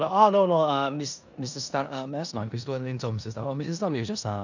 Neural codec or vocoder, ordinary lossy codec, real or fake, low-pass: codec, 16 kHz in and 24 kHz out, 0.9 kbps, LongCat-Audio-Codec, four codebook decoder; none; fake; 7.2 kHz